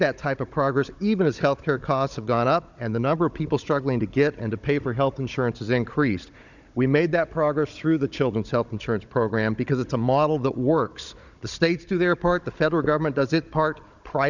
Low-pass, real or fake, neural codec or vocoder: 7.2 kHz; fake; codec, 16 kHz, 16 kbps, FunCodec, trained on Chinese and English, 50 frames a second